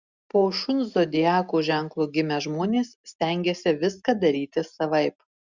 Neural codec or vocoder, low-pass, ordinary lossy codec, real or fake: none; 7.2 kHz; Opus, 64 kbps; real